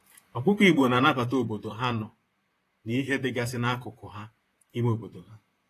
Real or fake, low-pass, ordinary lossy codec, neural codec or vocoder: fake; 14.4 kHz; AAC, 48 kbps; vocoder, 44.1 kHz, 128 mel bands, Pupu-Vocoder